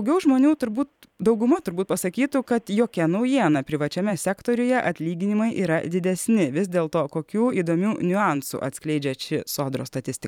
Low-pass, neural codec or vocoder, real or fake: 19.8 kHz; vocoder, 44.1 kHz, 128 mel bands every 512 samples, BigVGAN v2; fake